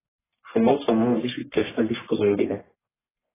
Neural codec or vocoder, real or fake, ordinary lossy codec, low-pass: codec, 44.1 kHz, 1.7 kbps, Pupu-Codec; fake; AAC, 16 kbps; 3.6 kHz